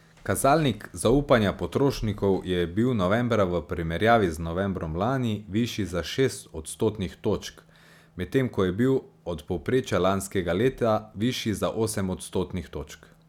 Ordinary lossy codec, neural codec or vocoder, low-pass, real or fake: none; none; 19.8 kHz; real